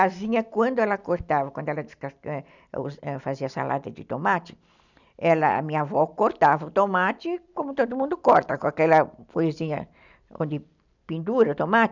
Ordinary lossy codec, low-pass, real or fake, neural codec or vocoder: none; 7.2 kHz; real; none